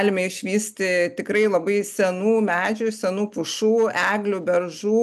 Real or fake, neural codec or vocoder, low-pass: real; none; 14.4 kHz